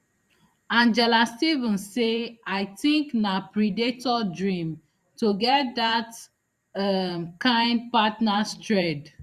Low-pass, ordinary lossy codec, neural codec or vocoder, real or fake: 14.4 kHz; Opus, 64 kbps; vocoder, 44.1 kHz, 128 mel bands every 512 samples, BigVGAN v2; fake